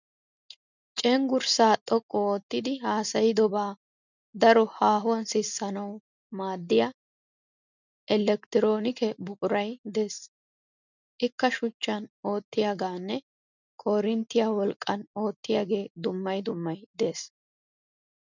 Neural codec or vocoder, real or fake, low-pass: none; real; 7.2 kHz